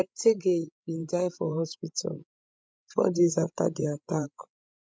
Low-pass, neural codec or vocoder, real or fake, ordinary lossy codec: none; codec, 16 kHz, 16 kbps, FreqCodec, larger model; fake; none